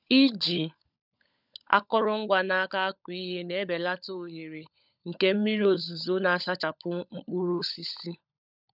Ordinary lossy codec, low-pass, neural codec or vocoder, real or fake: none; 5.4 kHz; codec, 16 kHz, 16 kbps, FunCodec, trained on LibriTTS, 50 frames a second; fake